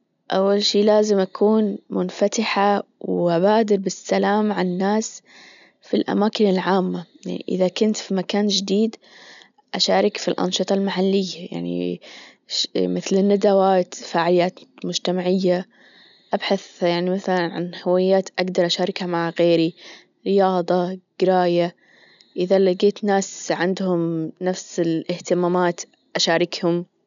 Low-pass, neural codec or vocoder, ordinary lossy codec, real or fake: 7.2 kHz; none; none; real